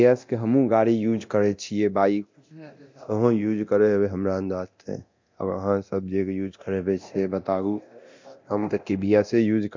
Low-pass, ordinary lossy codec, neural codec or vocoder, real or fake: 7.2 kHz; MP3, 48 kbps; codec, 24 kHz, 0.9 kbps, DualCodec; fake